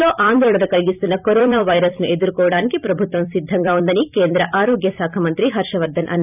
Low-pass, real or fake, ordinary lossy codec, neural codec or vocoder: 3.6 kHz; fake; none; vocoder, 44.1 kHz, 128 mel bands every 256 samples, BigVGAN v2